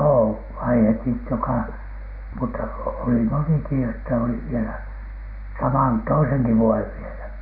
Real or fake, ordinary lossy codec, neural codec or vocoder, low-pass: real; none; none; 5.4 kHz